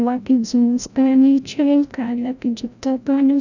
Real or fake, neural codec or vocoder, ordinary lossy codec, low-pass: fake; codec, 16 kHz, 0.5 kbps, FreqCodec, larger model; none; 7.2 kHz